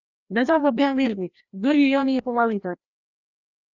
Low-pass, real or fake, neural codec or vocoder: 7.2 kHz; fake; codec, 16 kHz, 1 kbps, FreqCodec, larger model